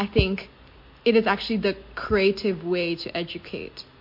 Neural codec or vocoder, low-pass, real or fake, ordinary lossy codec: none; 5.4 kHz; real; MP3, 32 kbps